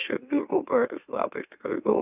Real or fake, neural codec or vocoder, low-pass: fake; autoencoder, 44.1 kHz, a latent of 192 numbers a frame, MeloTTS; 3.6 kHz